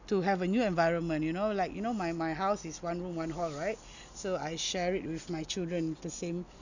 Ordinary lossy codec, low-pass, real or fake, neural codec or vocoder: none; 7.2 kHz; real; none